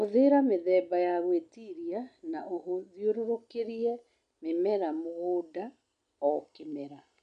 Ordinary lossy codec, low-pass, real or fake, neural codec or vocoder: none; 9.9 kHz; real; none